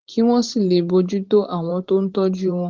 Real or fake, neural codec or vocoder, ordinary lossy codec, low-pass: fake; vocoder, 44.1 kHz, 128 mel bands every 512 samples, BigVGAN v2; Opus, 16 kbps; 7.2 kHz